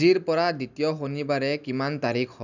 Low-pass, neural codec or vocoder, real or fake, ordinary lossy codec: 7.2 kHz; none; real; none